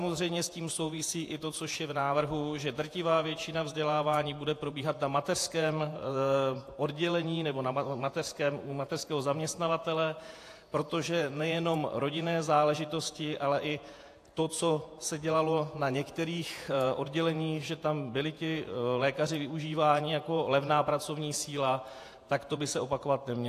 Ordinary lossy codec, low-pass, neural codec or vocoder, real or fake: AAC, 64 kbps; 14.4 kHz; vocoder, 48 kHz, 128 mel bands, Vocos; fake